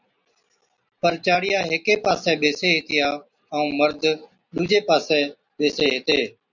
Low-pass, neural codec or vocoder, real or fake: 7.2 kHz; none; real